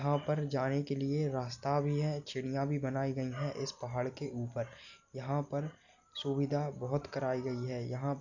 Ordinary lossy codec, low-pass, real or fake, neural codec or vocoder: none; 7.2 kHz; real; none